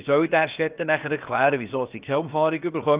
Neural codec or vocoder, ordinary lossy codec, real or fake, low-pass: codec, 16 kHz, about 1 kbps, DyCAST, with the encoder's durations; Opus, 32 kbps; fake; 3.6 kHz